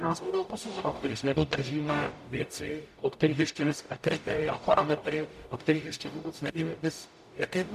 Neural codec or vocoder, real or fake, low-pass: codec, 44.1 kHz, 0.9 kbps, DAC; fake; 14.4 kHz